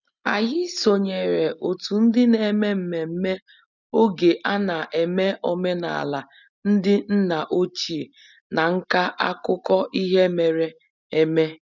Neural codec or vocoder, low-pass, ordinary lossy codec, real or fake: none; 7.2 kHz; none; real